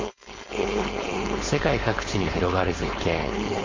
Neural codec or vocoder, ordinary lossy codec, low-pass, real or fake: codec, 16 kHz, 4.8 kbps, FACodec; MP3, 64 kbps; 7.2 kHz; fake